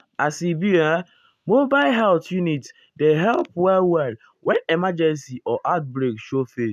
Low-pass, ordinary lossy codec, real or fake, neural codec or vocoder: 14.4 kHz; none; real; none